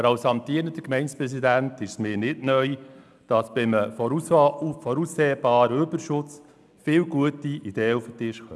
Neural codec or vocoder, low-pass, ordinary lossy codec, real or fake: none; none; none; real